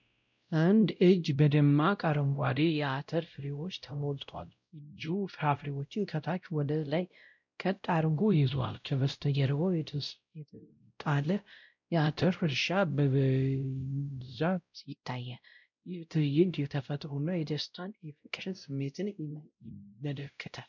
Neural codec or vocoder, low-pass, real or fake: codec, 16 kHz, 0.5 kbps, X-Codec, WavLM features, trained on Multilingual LibriSpeech; 7.2 kHz; fake